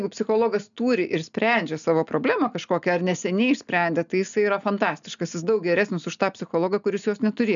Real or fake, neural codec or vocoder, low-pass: real; none; 7.2 kHz